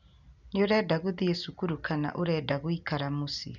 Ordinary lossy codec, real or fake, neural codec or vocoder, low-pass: none; real; none; 7.2 kHz